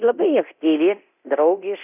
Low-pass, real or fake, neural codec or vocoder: 3.6 kHz; fake; codec, 24 kHz, 0.9 kbps, DualCodec